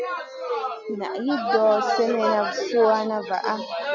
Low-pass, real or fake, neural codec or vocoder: 7.2 kHz; real; none